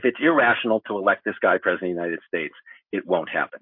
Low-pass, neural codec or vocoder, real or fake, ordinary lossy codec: 5.4 kHz; none; real; MP3, 32 kbps